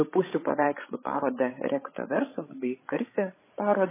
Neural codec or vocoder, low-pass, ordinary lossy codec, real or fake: codec, 16 kHz, 16 kbps, FreqCodec, larger model; 3.6 kHz; MP3, 16 kbps; fake